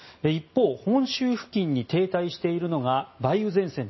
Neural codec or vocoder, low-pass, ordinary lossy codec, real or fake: none; 7.2 kHz; MP3, 24 kbps; real